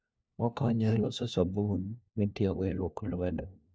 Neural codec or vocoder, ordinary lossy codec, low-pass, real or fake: codec, 16 kHz, 1 kbps, FunCodec, trained on LibriTTS, 50 frames a second; none; none; fake